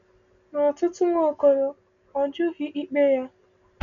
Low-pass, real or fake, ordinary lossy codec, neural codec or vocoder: 7.2 kHz; real; none; none